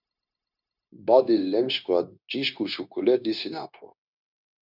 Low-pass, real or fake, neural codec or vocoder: 5.4 kHz; fake; codec, 16 kHz, 0.9 kbps, LongCat-Audio-Codec